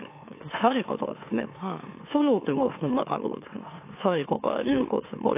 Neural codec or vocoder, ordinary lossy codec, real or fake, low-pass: autoencoder, 44.1 kHz, a latent of 192 numbers a frame, MeloTTS; AAC, 24 kbps; fake; 3.6 kHz